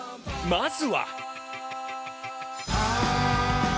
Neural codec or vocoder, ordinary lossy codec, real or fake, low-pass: none; none; real; none